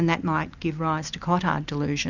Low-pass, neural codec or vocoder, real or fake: 7.2 kHz; none; real